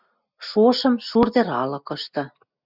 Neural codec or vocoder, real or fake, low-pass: none; real; 5.4 kHz